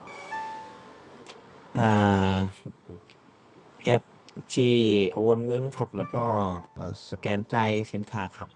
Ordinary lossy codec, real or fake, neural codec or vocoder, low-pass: none; fake; codec, 24 kHz, 0.9 kbps, WavTokenizer, medium music audio release; 10.8 kHz